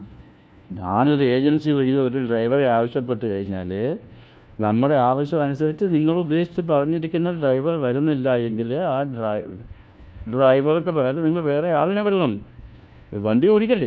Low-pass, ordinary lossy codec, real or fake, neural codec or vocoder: none; none; fake; codec, 16 kHz, 1 kbps, FunCodec, trained on LibriTTS, 50 frames a second